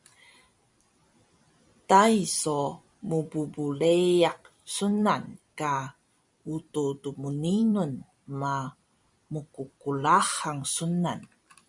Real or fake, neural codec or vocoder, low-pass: fake; vocoder, 44.1 kHz, 128 mel bands every 256 samples, BigVGAN v2; 10.8 kHz